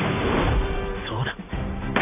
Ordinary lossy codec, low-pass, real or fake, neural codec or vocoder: none; 3.6 kHz; fake; vocoder, 44.1 kHz, 128 mel bands every 512 samples, BigVGAN v2